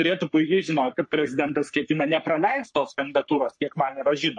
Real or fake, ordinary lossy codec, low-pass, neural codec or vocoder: fake; MP3, 48 kbps; 10.8 kHz; codec, 44.1 kHz, 3.4 kbps, Pupu-Codec